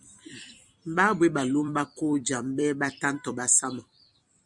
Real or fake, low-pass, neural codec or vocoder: fake; 10.8 kHz; vocoder, 44.1 kHz, 128 mel bands every 256 samples, BigVGAN v2